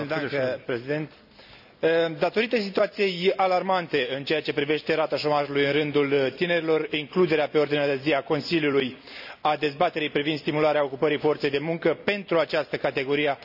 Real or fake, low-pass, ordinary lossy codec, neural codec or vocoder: real; 5.4 kHz; none; none